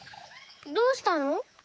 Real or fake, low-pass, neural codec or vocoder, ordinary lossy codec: fake; none; codec, 16 kHz, 4 kbps, X-Codec, HuBERT features, trained on general audio; none